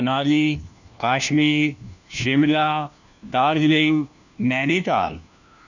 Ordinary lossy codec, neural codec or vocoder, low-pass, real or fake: none; codec, 16 kHz, 1 kbps, FunCodec, trained on LibriTTS, 50 frames a second; 7.2 kHz; fake